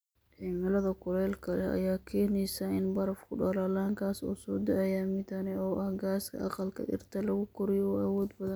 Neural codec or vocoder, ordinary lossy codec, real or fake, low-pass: none; none; real; none